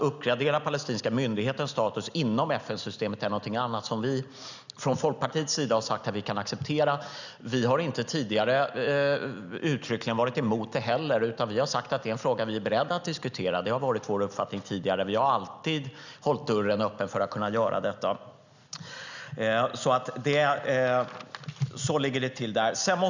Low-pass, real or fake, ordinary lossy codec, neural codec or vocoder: 7.2 kHz; real; none; none